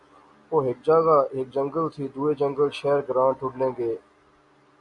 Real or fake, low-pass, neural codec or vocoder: real; 10.8 kHz; none